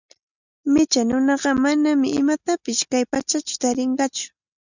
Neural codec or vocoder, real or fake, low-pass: none; real; 7.2 kHz